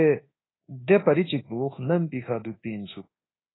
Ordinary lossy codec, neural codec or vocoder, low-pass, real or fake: AAC, 16 kbps; codec, 24 kHz, 1.2 kbps, DualCodec; 7.2 kHz; fake